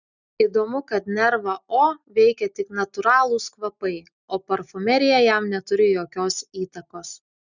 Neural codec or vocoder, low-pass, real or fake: none; 7.2 kHz; real